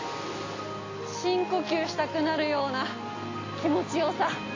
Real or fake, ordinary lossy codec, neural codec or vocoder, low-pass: real; AAC, 32 kbps; none; 7.2 kHz